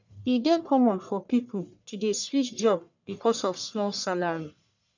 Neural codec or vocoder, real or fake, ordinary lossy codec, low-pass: codec, 44.1 kHz, 1.7 kbps, Pupu-Codec; fake; none; 7.2 kHz